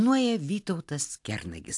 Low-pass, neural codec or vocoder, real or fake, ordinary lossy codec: 10.8 kHz; none; real; AAC, 64 kbps